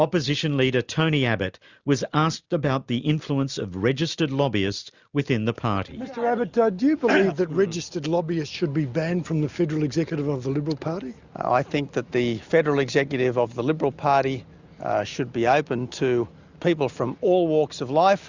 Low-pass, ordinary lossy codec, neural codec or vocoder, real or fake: 7.2 kHz; Opus, 64 kbps; none; real